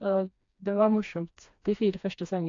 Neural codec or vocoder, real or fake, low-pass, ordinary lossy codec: codec, 16 kHz, 2 kbps, FreqCodec, smaller model; fake; 7.2 kHz; AAC, 48 kbps